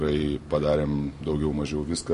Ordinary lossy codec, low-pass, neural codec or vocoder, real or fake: MP3, 48 kbps; 14.4 kHz; none; real